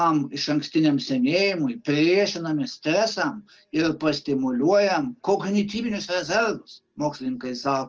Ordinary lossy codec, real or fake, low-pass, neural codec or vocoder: Opus, 24 kbps; real; 7.2 kHz; none